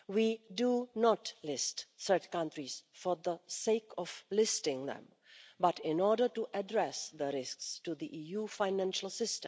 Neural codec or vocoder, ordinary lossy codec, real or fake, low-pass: none; none; real; none